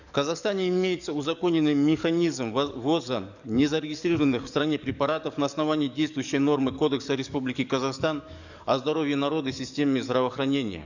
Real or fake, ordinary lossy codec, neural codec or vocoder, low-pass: fake; none; codec, 44.1 kHz, 7.8 kbps, DAC; 7.2 kHz